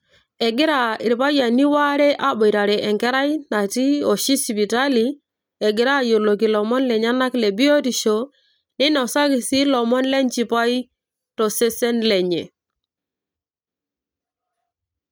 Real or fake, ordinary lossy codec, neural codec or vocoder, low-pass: real; none; none; none